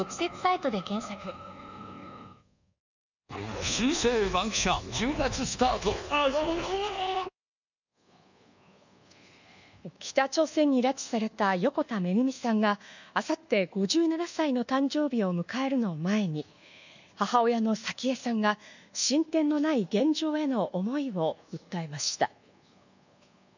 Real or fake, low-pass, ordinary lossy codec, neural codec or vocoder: fake; 7.2 kHz; none; codec, 24 kHz, 1.2 kbps, DualCodec